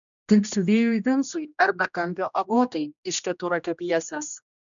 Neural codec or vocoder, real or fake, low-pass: codec, 16 kHz, 1 kbps, X-Codec, HuBERT features, trained on general audio; fake; 7.2 kHz